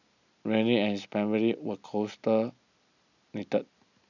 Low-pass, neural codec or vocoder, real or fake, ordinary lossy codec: 7.2 kHz; none; real; none